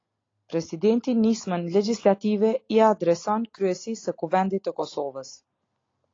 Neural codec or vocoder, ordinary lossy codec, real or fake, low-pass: none; AAC, 32 kbps; real; 7.2 kHz